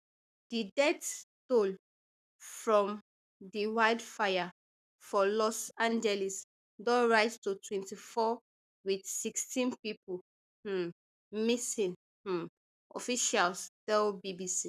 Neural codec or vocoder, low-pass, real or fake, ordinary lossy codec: codec, 44.1 kHz, 7.8 kbps, Pupu-Codec; 14.4 kHz; fake; none